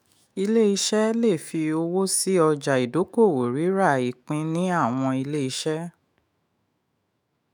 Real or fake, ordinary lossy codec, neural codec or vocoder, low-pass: fake; none; autoencoder, 48 kHz, 128 numbers a frame, DAC-VAE, trained on Japanese speech; none